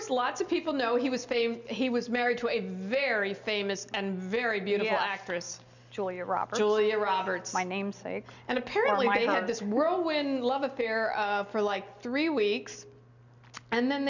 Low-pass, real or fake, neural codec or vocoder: 7.2 kHz; real; none